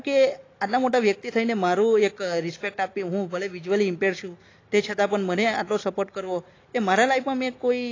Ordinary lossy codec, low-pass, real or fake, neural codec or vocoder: AAC, 32 kbps; 7.2 kHz; real; none